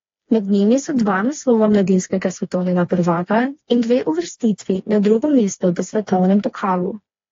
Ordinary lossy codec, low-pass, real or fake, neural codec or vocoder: AAC, 32 kbps; 7.2 kHz; fake; codec, 16 kHz, 2 kbps, FreqCodec, smaller model